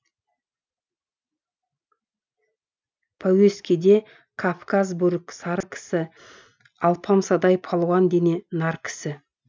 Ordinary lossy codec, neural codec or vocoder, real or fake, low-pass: none; none; real; none